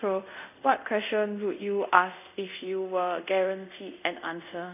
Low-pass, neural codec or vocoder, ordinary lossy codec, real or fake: 3.6 kHz; codec, 24 kHz, 0.5 kbps, DualCodec; none; fake